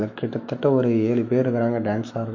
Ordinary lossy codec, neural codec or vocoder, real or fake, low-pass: MP3, 48 kbps; autoencoder, 48 kHz, 128 numbers a frame, DAC-VAE, trained on Japanese speech; fake; 7.2 kHz